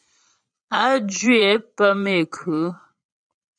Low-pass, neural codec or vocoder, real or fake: 9.9 kHz; vocoder, 22.05 kHz, 80 mel bands, Vocos; fake